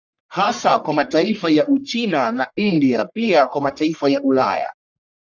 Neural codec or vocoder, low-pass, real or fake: codec, 44.1 kHz, 1.7 kbps, Pupu-Codec; 7.2 kHz; fake